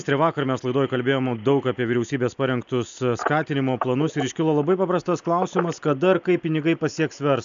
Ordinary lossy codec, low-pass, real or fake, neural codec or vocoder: AAC, 96 kbps; 7.2 kHz; real; none